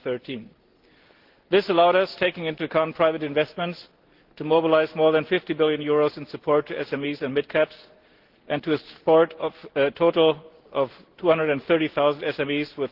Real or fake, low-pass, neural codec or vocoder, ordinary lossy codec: real; 5.4 kHz; none; Opus, 16 kbps